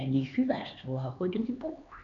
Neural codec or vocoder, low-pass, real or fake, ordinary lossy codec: codec, 16 kHz, 4 kbps, X-Codec, HuBERT features, trained on LibriSpeech; 7.2 kHz; fake; none